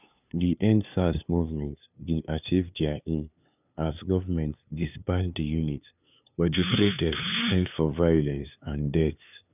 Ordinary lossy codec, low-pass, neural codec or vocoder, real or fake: none; 3.6 kHz; codec, 16 kHz, 2 kbps, FunCodec, trained on LibriTTS, 25 frames a second; fake